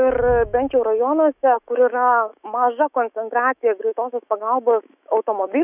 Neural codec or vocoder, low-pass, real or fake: autoencoder, 48 kHz, 128 numbers a frame, DAC-VAE, trained on Japanese speech; 3.6 kHz; fake